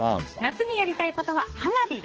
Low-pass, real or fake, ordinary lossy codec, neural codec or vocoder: 7.2 kHz; fake; Opus, 16 kbps; codec, 16 kHz, 2 kbps, X-Codec, HuBERT features, trained on balanced general audio